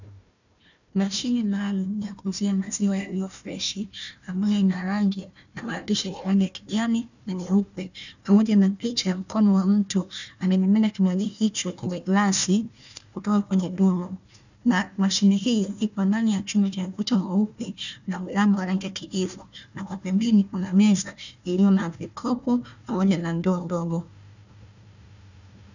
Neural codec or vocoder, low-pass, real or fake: codec, 16 kHz, 1 kbps, FunCodec, trained on Chinese and English, 50 frames a second; 7.2 kHz; fake